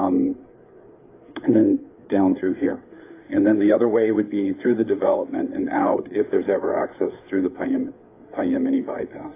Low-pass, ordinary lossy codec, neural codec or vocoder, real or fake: 3.6 kHz; AAC, 24 kbps; codec, 16 kHz, 4 kbps, FreqCodec, larger model; fake